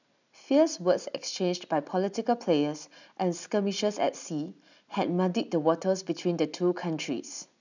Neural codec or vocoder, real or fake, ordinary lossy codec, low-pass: none; real; none; 7.2 kHz